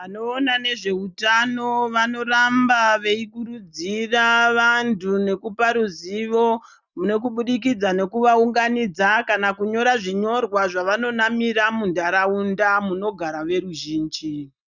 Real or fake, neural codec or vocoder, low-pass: real; none; 7.2 kHz